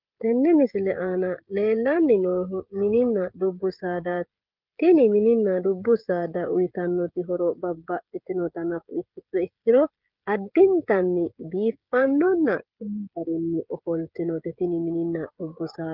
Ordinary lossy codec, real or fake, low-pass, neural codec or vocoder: Opus, 24 kbps; fake; 5.4 kHz; codec, 16 kHz, 16 kbps, FreqCodec, smaller model